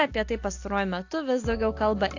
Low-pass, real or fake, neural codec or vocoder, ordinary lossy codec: 7.2 kHz; real; none; AAC, 48 kbps